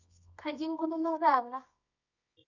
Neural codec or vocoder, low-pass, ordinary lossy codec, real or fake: codec, 24 kHz, 0.9 kbps, WavTokenizer, medium music audio release; 7.2 kHz; none; fake